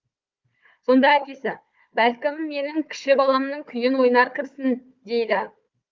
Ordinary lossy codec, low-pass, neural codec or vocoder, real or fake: Opus, 24 kbps; 7.2 kHz; codec, 16 kHz, 4 kbps, FunCodec, trained on Chinese and English, 50 frames a second; fake